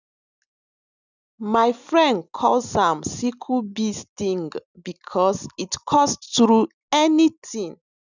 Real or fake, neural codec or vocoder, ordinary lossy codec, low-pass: real; none; none; 7.2 kHz